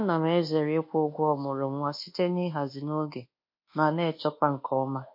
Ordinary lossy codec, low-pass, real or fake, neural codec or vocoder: MP3, 32 kbps; 5.4 kHz; fake; codec, 24 kHz, 1.2 kbps, DualCodec